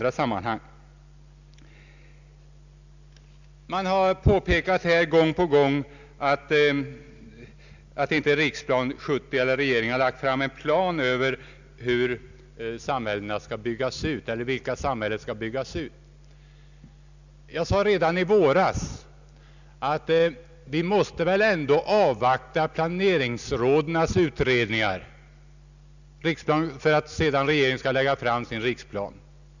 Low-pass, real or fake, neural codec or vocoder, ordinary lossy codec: 7.2 kHz; real; none; none